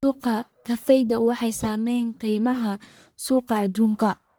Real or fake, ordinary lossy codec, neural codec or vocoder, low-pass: fake; none; codec, 44.1 kHz, 1.7 kbps, Pupu-Codec; none